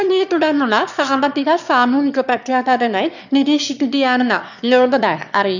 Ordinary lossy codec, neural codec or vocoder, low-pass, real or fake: none; autoencoder, 22.05 kHz, a latent of 192 numbers a frame, VITS, trained on one speaker; 7.2 kHz; fake